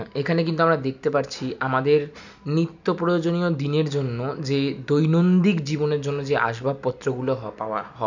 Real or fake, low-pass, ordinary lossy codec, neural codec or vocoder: real; 7.2 kHz; none; none